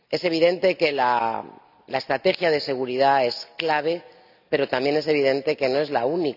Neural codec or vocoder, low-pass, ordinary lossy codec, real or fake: none; 5.4 kHz; none; real